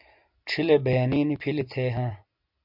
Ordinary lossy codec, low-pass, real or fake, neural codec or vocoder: MP3, 48 kbps; 5.4 kHz; real; none